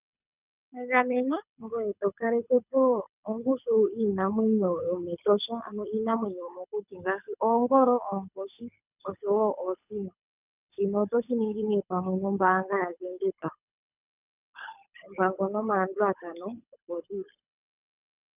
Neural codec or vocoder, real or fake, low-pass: codec, 24 kHz, 6 kbps, HILCodec; fake; 3.6 kHz